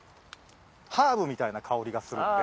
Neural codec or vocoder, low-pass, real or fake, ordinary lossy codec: none; none; real; none